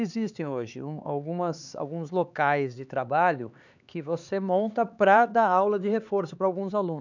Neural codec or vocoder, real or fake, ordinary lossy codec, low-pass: codec, 16 kHz, 4 kbps, X-Codec, HuBERT features, trained on LibriSpeech; fake; none; 7.2 kHz